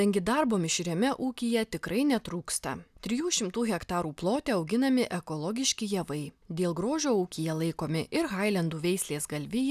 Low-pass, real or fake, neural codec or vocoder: 14.4 kHz; real; none